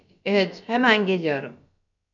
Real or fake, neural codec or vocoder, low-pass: fake; codec, 16 kHz, about 1 kbps, DyCAST, with the encoder's durations; 7.2 kHz